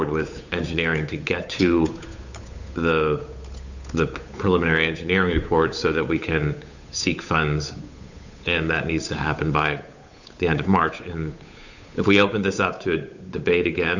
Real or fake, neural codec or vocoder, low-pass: fake; codec, 16 kHz, 8 kbps, FunCodec, trained on Chinese and English, 25 frames a second; 7.2 kHz